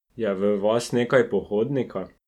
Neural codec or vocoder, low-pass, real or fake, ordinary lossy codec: none; 19.8 kHz; real; none